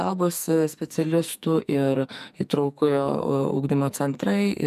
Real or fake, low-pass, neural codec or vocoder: fake; 14.4 kHz; codec, 44.1 kHz, 2.6 kbps, SNAC